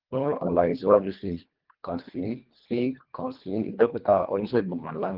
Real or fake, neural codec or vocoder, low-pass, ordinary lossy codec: fake; codec, 24 kHz, 1.5 kbps, HILCodec; 5.4 kHz; Opus, 32 kbps